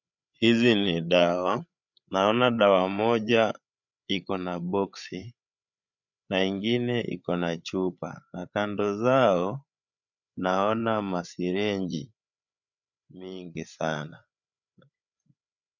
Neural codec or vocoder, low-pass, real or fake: codec, 16 kHz, 8 kbps, FreqCodec, larger model; 7.2 kHz; fake